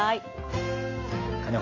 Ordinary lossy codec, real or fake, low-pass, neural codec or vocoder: AAC, 32 kbps; real; 7.2 kHz; none